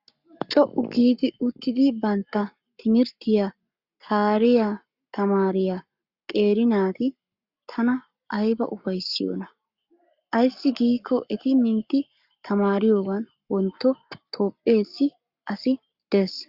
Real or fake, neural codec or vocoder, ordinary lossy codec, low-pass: fake; codec, 44.1 kHz, 7.8 kbps, Pupu-Codec; Opus, 64 kbps; 5.4 kHz